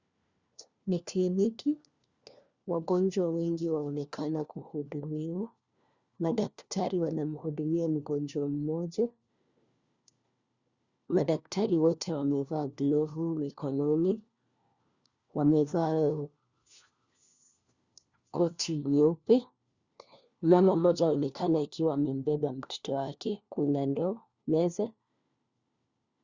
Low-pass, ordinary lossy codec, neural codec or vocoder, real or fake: 7.2 kHz; Opus, 64 kbps; codec, 16 kHz, 1 kbps, FunCodec, trained on LibriTTS, 50 frames a second; fake